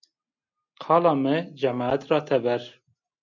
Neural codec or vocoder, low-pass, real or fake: none; 7.2 kHz; real